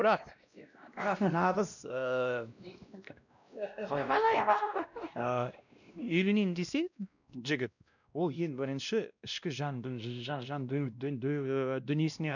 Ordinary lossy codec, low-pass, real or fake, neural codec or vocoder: none; 7.2 kHz; fake; codec, 16 kHz, 1 kbps, X-Codec, WavLM features, trained on Multilingual LibriSpeech